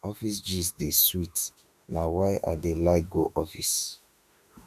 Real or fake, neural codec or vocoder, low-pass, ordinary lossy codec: fake; autoencoder, 48 kHz, 32 numbers a frame, DAC-VAE, trained on Japanese speech; 14.4 kHz; none